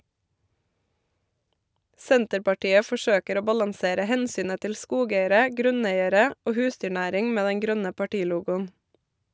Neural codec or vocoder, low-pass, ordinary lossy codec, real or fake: none; none; none; real